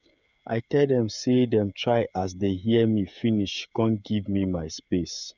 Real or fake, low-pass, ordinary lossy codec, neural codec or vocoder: fake; 7.2 kHz; none; codec, 16 kHz, 8 kbps, FreqCodec, smaller model